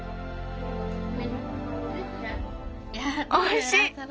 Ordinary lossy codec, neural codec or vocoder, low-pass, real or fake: none; none; none; real